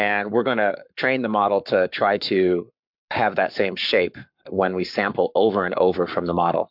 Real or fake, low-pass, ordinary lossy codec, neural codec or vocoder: fake; 5.4 kHz; MP3, 48 kbps; codec, 44.1 kHz, 7.8 kbps, Pupu-Codec